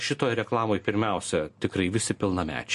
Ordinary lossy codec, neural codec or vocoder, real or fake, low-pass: MP3, 48 kbps; none; real; 14.4 kHz